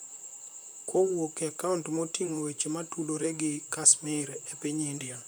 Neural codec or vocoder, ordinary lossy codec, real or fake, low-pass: vocoder, 44.1 kHz, 128 mel bands, Pupu-Vocoder; none; fake; none